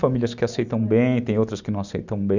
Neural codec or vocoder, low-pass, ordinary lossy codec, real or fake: none; 7.2 kHz; none; real